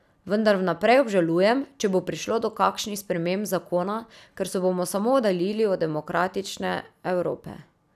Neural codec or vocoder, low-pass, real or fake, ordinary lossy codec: none; 14.4 kHz; real; none